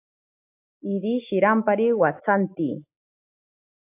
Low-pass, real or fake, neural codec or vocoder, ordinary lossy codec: 3.6 kHz; real; none; AAC, 24 kbps